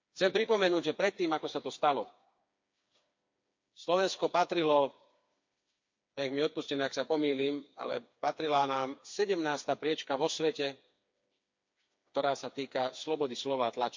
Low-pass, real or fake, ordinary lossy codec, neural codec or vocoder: 7.2 kHz; fake; MP3, 48 kbps; codec, 16 kHz, 4 kbps, FreqCodec, smaller model